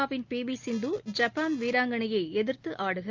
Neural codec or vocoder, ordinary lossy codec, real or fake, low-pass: none; Opus, 32 kbps; real; 7.2 kHz